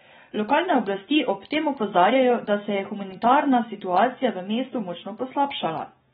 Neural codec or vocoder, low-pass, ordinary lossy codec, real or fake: none; 19.8 kHz; AAC, 16 kbps; real